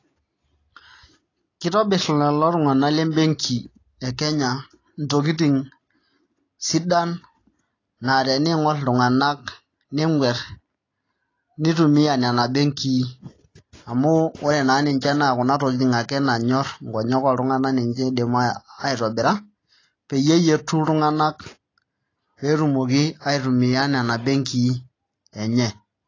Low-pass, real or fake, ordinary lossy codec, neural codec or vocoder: 7.2 kHz; real; AAC, 32 kbps; none